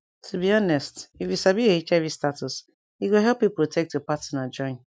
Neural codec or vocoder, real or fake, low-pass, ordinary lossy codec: none; real; none; none